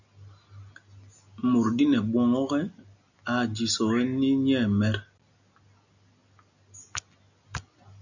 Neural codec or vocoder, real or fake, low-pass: none; real; 7.2 kHz